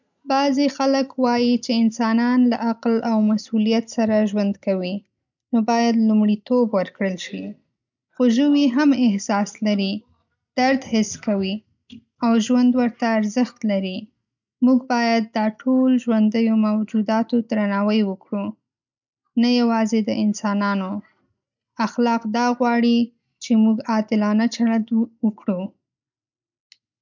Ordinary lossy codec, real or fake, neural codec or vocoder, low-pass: none; real; none; 7.2 kHz